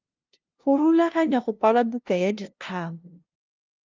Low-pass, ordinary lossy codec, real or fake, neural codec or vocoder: 7.2 kHz; Opus, 24 kbps; fake; codec, 16 kHz, 0.5 kbps, FunCodec, trained on LibriTTS, 25 frames a second